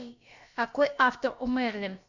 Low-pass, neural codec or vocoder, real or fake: 7.2 kHz; codec, 16 kHz, about 1 kbps, DyCAST, with the encoder's durations; fake